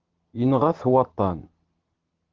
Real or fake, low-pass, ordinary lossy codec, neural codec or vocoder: real; 7.2 kHz; Opus, 16 kbps; none